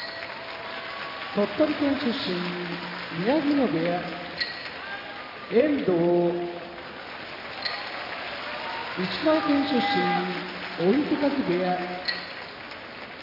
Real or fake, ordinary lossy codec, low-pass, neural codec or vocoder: real; none; 5.4 kHz; none